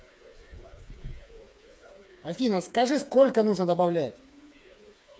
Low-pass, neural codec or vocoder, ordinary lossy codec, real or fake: none; codec, 16 kHz, 4 kbps, FreqCodec, smaller model; none; fake